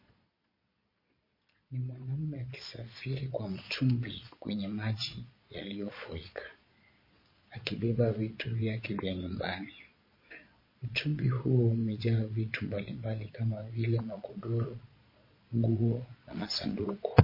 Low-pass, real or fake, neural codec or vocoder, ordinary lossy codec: 5.4 kHz; fake; vocoder, 22.05 kHz, 80 mel bands, Vocos; MP3, 24 kbps